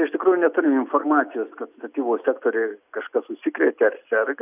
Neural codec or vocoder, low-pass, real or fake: none; 3.6 kHz; real